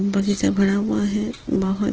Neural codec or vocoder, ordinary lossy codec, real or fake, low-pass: none; Opus, 16 kbps; real; 7.2 kHz